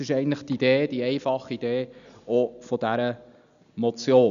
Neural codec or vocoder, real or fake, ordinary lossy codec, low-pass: none; real; none; 7.2 kHz